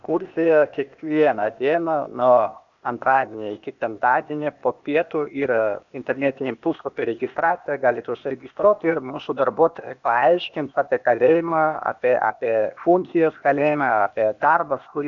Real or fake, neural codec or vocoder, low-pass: fake; codec, 16 kHz, 0.8 kbps, ZipCodec; 7.2 kHz